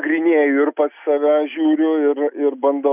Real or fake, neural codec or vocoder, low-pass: real; none; 3.6 kHz